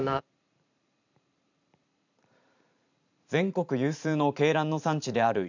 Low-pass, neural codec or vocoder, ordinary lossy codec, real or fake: 7.2 kHz; vocoder, 44.1 kHz, 128 mel bands every 512 samples, BigVGAN v2; none; fake